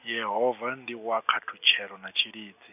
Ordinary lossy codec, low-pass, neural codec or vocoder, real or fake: none; 3.6 kHz; none; real